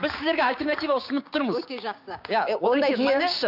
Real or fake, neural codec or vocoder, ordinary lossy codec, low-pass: fake; codec, 16 kHz, 6 kbps, DAC; AAC, 48 kbps; 5.4 kHz